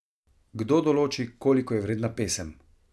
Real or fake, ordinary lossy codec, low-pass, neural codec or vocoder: real; none; none; none